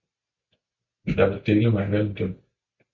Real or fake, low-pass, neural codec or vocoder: real; 7.2 kHz; none